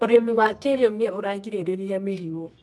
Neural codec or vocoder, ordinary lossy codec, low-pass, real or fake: codec, 24 kHz, 0.9 kbps, WavTokenizer, medium music audio release; none; none; fake